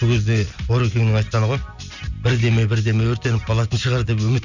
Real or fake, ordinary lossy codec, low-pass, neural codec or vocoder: real; none; 7.2 kHz; none